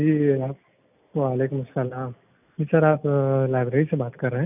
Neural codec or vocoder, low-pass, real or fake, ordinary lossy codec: none; 3.6 kHz; real; none